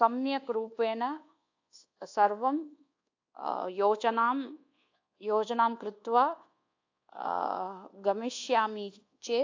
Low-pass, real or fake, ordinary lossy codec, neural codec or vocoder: 7.2 kHz; fake; AAC, 48 kbps; codec, 24 kHz, 1.2 kbps, DualCodec